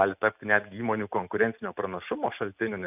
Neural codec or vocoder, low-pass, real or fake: none; 3.6 kHz; real